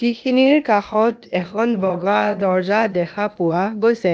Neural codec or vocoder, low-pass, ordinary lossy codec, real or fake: codec, 16 kHz, 0.8 kbps, ZipCodec; none; none; fake